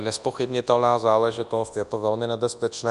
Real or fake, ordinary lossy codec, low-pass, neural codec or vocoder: fake; Opus, 64 kbps; 10.8 kHz; codec, 24 kHz, 0.9 kbps, WavTokenizer, large speech release